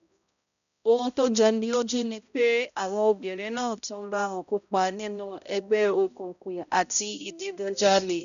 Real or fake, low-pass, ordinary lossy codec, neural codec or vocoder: fake; 7.2 kHz; none; codec, 16 kHz, 0.5 kbps, X-Codec, HuBERT features, trained on balanced general audio